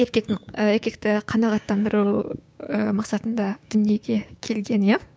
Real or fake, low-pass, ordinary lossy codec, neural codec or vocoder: fake; none; none; codec, 16 kHz, 6 kbps, DAC